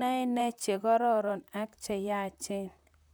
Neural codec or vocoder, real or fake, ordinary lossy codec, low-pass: vocoder, 44.1 kHz, 128 mel bands, Pupu-Vocoder; fake; none; none